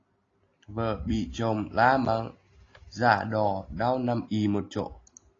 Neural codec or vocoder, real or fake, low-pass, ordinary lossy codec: none; real; 7.2 kHz; AAC, 32 kbps